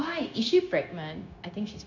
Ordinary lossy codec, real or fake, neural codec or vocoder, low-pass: none; fake; codec, 16 kHz, 0.9 kbps, LongCat-Audio-Codec; 7.2 kHz